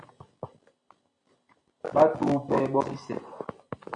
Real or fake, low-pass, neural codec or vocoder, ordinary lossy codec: real; 9.9 kHz; none; AAC, 64 kbps